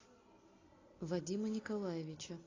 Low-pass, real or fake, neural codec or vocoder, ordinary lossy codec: 7.2 kHz; real; none; AAC, 32 kbps